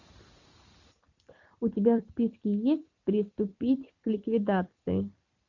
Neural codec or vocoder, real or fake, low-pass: vocoder, 44.1 kHz, 128 mel bands every 256 samples, BigVGAN v2; fake; 7.2 kHz